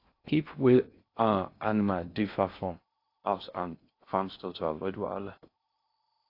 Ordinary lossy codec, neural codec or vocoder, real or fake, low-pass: AAC, 32 kbps; codec, 16 kHz in and 24 kHz out, 0.6 kbps, FocalCodec, streaming, 2048 codes; fake; 5.4 kHz